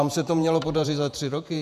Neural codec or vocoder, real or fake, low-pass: vocoder, 48 kHz, 128 mel bands, Vocos; fake; 14.4 kHz